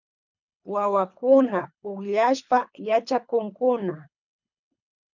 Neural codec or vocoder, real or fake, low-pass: codec, 24 kHz, 3 kbps, HILCodec; fake; 7.2 kHz